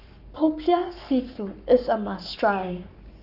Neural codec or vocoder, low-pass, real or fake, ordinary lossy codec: codec, 44.1 kHz, 7.8 kbps, Pupu-Codec; 5.4 kHz; fake; none